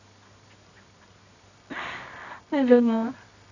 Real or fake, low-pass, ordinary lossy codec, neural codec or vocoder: fake; 7.2 kHz; none; codec, 24 kHz, 0.9 kbps, WavTokenizer, medium music audio release